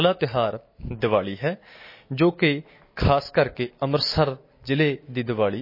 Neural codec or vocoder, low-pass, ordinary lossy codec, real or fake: none; 5.4 kHz; MP3, 24 kbps; real